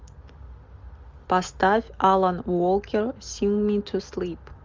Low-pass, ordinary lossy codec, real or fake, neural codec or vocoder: 7.2 kHz; Opus, 32 kbps; real; none